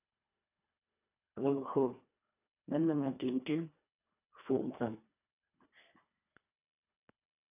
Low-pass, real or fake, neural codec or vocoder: 3.6 kHz; fake; codec, 24 kHz, 1.5 kbps, HILCodec